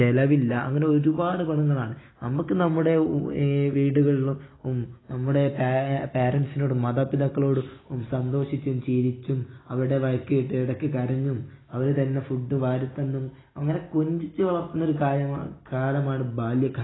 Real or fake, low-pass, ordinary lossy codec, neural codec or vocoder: real; 7.2 kHz; AAC, 16 kbps; none